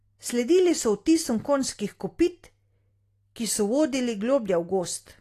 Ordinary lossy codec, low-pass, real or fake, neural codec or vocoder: AAC, 48 kbps; 14.4 kHz; real; none